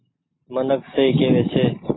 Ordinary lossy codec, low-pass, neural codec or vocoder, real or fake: AAC, 16 kbps; 7.2 kHz; none; real